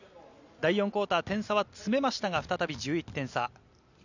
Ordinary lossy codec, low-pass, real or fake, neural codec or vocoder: none; 7.2 kHz; real; none